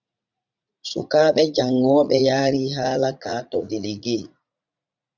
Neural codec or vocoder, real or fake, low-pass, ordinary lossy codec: vocoder, 44.1 kHz, 80 mel bands, Vocos; fake; 7.2 kHz; Opus, 64 kbps